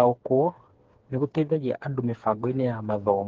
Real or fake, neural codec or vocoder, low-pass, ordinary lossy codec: fake; codec, 16 kHz, 4 kbps, FreqCodec, smaller model; 7.2 kHz; Opus, 16 kbps